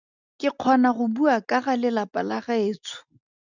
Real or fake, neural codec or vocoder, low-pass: real; none; 7.2 kHz